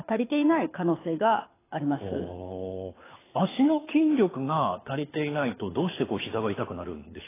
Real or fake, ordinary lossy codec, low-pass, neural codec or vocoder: fake; AAC, 16 kbps; 3.6 kHz; codec, 24 kHz, 6 kbps, HILCodec